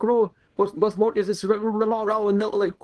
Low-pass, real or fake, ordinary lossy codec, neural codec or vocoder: 10.8 kHz; fake; Opus, 16 kbps; codec, 24 kHz, 0.9 kbps, WavTokenizer, small release